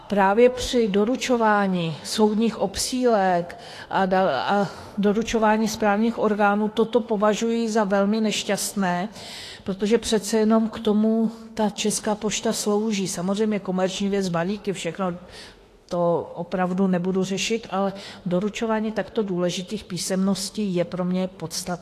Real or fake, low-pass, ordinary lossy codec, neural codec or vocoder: fake; 14.4 kHz; AAC, 48 kbps; autoencoder, 48 kHz, 32 numbers a frame, DAC-VAE, trained on Japanese speech